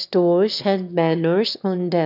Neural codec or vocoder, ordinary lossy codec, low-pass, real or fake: autoencoder, 22.05 kHz, a latent of 192 numbers a frame, VITS, trained on one speaker; none; 5.4 kHz; fake